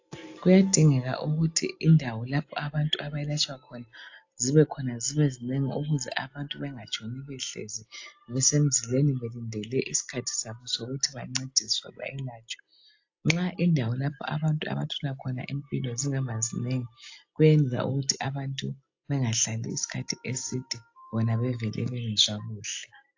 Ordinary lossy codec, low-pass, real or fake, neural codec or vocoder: AAC, 48 kbps; 7.2 kHz; real; none